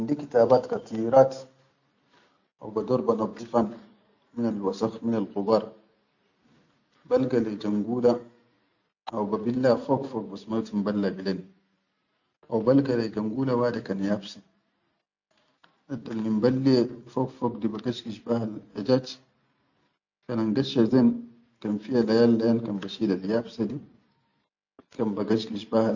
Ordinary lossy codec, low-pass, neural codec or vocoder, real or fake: none; 7.2 kHz; none; real